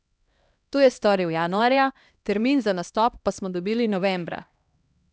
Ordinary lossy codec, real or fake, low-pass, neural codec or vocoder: none; fake; none; codec, 16 kHz, 1 kbps, X-Codec, HuBERT features, trained on LibriSpeech